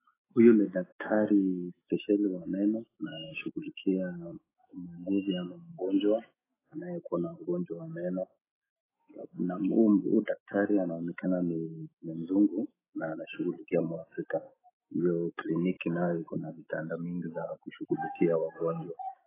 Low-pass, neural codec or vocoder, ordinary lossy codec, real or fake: 3.6 kHz; autoencoder, 48 kHz, 128 numbers a frame, DAC-VAE, trained on Japanese speech; AAC, 16 kbps; fake